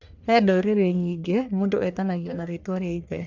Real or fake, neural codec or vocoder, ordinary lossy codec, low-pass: fake; codec, 44.1 kHz, 1.7 kbps, Pupu-Codec; none; 7.2 kHz